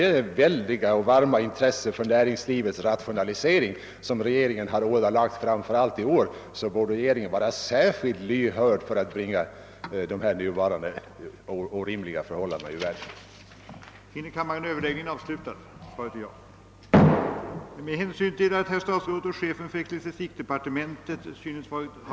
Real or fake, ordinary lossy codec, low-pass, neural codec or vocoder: real; none; none; none